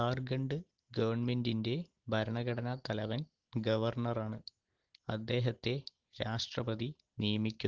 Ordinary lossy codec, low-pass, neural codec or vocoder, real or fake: Opus, 16 kbps; 7.2 kHz; none; real